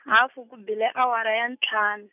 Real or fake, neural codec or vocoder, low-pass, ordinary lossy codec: real; none; 3.6 kHz; none